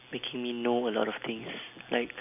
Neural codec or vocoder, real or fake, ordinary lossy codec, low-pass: none; real; none; 3.6 kHz